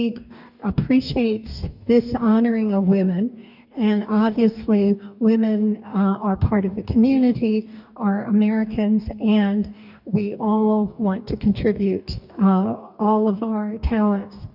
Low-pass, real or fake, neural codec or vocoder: 5.4 kHz; fake; codec, 44.1 kHz, 2.6 kbps, DAC